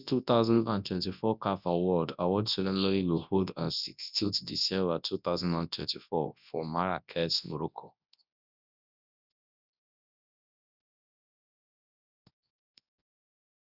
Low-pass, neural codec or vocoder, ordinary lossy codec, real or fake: 5.4 kHz; codec, 24 kHz, 0.9 kbps, WavTokenizer, large speech release; none; fake